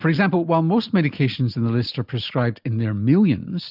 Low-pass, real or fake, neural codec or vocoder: 5.4 kHz; real; none